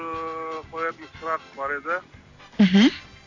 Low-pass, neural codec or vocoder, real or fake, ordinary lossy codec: 7.2 kHz; none; real; AAC, 48 kbps